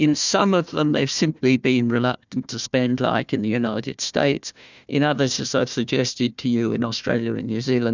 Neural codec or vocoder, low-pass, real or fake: codec, 16 kHz, 1 kbps, FunCodec, trained on Chinese and English, 50 frames a second; 7.2 kHz; fake